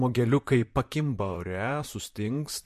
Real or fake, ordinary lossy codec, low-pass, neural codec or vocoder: fake; MP3, 64 kbps; 14.4 kHz; vocoder, 44.1 kHz, 128 mel bands, Pupu-Vocoder